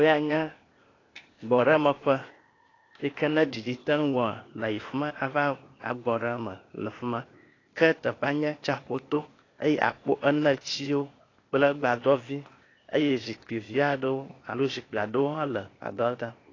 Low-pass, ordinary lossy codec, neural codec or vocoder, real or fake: 7.2 kHz; AAC, 32 kbps; codec, 16 kHz, 0.8 kbps, ZipCodec; fake